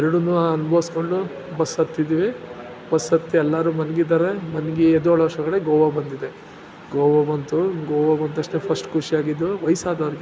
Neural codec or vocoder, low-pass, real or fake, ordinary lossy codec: none; none; real; none